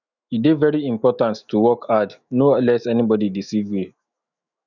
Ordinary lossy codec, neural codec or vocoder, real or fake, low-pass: none; autoencoder, 48 kHz, 128 numbers a frame, DAC-VAE, trained on Japanese speech; fake; 7.2 kHz